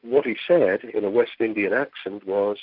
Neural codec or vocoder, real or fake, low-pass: none; real; 5.4 kHz